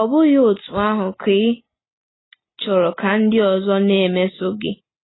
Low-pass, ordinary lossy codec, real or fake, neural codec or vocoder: 7.2 kHz; AAC, 16 kbps; real; none